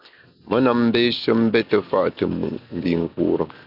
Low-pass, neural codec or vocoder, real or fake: 5.4 kHz; none; real